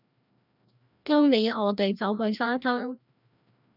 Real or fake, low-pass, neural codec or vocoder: fake; 5.4 kHz; codec, 16 kHz, 1 kbps, FreqCodec, larger model